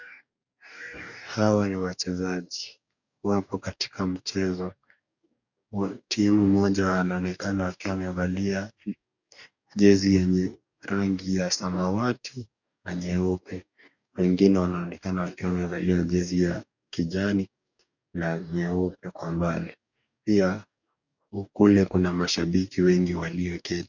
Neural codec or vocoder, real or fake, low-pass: codec, 44.1 kHz, 2.6 kbps, DAC; fake; 7.2 kHz